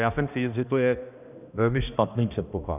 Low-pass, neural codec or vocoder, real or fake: 3.6 kHz; codec, 16 kHz, 1 kbps, X-Codec, HuBERT features, trained on balanced general audio; fake